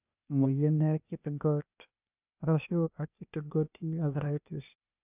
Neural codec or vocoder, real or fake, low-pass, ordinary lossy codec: codec, 16 kHz, 0.8 kbps, ZipCodec; fake; 3.6 kHz; none